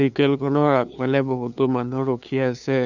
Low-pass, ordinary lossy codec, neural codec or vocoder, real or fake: 7.2 kHz; none; codec, 16 kHz, 2 kbps, FunCodec, trained on LibriTTS, 25 frames a second; fake